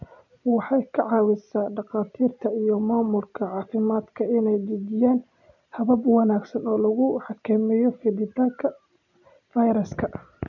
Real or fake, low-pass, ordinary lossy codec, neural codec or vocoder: real; 7.2 kHz; none; none